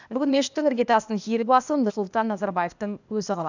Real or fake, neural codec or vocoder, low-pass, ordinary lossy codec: fake; codec, 16 kHz, 0.8 kbps, ZipCodec; 7.2 kHz; none